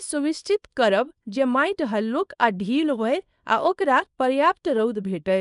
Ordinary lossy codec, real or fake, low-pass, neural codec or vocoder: none; fake; 10.8 kHz; codec, 24 kHz, 0.9 kbps, WavTokenizer, small release